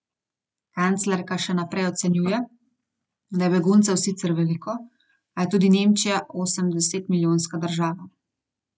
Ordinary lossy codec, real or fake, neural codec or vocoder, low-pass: none; real; none; none